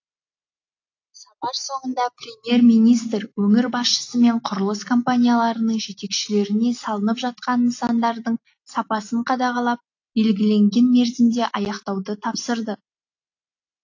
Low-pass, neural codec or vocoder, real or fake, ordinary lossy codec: 7.2 kHz; none; real; AAC, 48 kbps